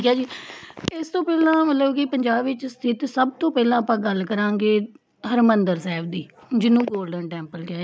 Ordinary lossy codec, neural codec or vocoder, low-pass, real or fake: none; none; none; real